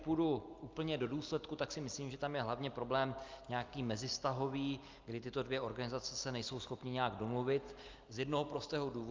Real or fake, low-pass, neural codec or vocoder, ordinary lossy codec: real; 7.2 kHz; none; Opus, 24 kbps